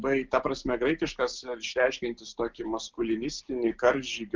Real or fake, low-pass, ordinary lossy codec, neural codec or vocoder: real; 7.2 kHz; Opus, 24 kbps; none